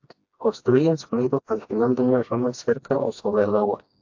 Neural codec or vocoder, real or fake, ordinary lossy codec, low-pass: codec, 16 kHz, 1 kbps, FreqCodec, smaller model; fake; AAC, 48 kbps; 7.2 kHz